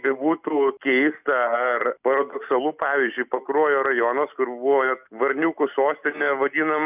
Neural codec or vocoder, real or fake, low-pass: none; real; 3.6 kHz